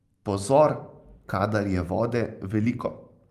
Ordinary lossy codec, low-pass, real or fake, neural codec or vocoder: Opus, 32 kbps; 14.4 kHz; fake; vocoder, 44.1 kHz, 128 mel bands every 512 samples, BigVGAN v2